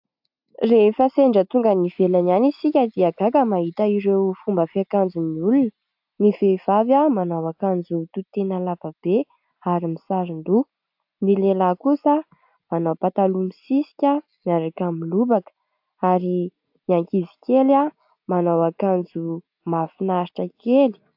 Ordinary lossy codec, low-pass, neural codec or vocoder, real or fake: AAC, 48 kbps; 5.4 kHz; none; real